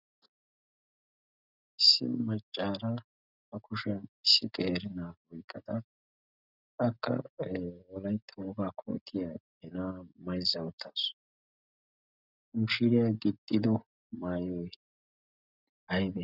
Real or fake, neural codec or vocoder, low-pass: real; none; 5.4 kHz